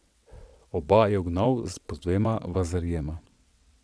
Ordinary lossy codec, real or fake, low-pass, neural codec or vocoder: none; fake; none; vocoder, 22.05 kHz, 80 mel bands, WaveNeXt